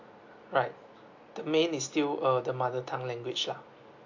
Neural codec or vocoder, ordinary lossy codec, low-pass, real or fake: none; AAC, 48 kbps; 7.2 kHz; real